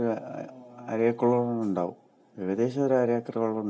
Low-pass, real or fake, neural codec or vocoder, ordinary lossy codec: none; real; none; none